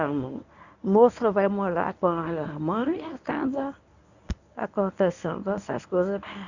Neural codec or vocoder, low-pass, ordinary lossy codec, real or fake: codec, 24 kHz, 0.9 kbps, WavTokenizer, medium speech release version 1; 7.2 kHz; none; fake